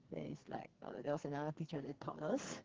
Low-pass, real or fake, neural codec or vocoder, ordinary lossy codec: 7.2 kHz; fake; codec, 44.1 kHz, 2.6 kbps, SNAC; Opus, 16 kbps